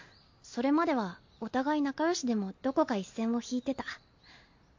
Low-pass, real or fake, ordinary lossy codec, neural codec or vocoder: 7.2 kHz; real; MP3, 48 kbps; none